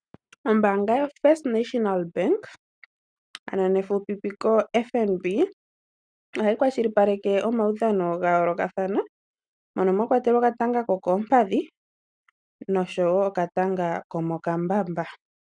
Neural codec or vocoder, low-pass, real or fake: none; 9.9 kHz; real